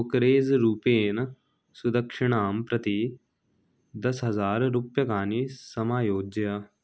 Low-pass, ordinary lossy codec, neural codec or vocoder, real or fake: none; none; none; real